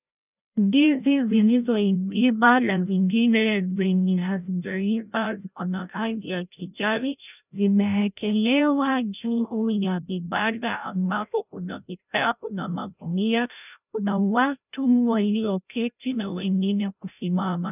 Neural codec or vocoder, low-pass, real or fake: codec, 16 kHz, 0.5 kbps, FreqCodec, larger model; 3.6 kHz; fake